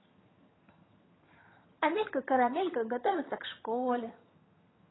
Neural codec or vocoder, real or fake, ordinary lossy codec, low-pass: vocoder, 22.05 kHz, 80 mel bands, HiFi-GAN; fake; AAC, 16 kbps; 7.2 kHz